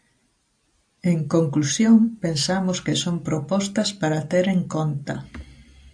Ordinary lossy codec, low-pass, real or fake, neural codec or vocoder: AAC, 64 kbps; 9.9 kHz; real; none